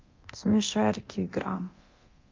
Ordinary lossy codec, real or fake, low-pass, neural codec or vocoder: Opus, 24 kbps; fake; 7.2 kHz; codec, 24 kHz, 0.9 kbps, DualCodec